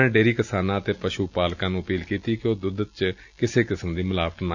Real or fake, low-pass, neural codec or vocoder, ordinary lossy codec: real; 7.2 kHz; none; none